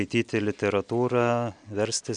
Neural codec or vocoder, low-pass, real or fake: none; 9.9 kHz; real